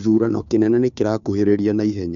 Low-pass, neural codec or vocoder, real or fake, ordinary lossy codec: 7.2 kHz; codec, 16 kHz, 2 kbps, FunCodec, trained on Chinese and English, 25 frames a second; fake; none